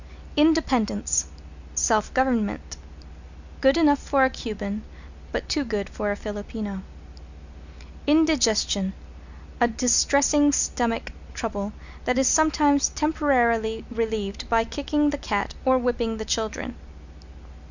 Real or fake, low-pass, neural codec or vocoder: real; 7.2 kHz; none